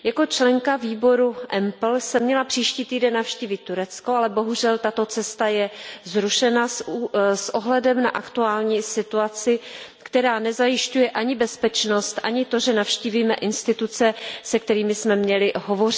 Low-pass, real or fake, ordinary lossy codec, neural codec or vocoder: none; real; none; none